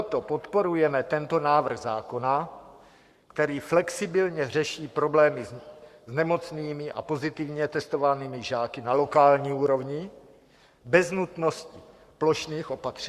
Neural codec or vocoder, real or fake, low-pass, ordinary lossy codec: codec, 44.1 kHz, 7.8 kbps, Pupu-Codec; fake; 14.4 kHz; Opus, 64 kbps